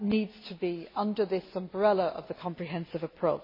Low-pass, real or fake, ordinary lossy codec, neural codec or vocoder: 5.4 kHz; real; MP3, 24 kbps; none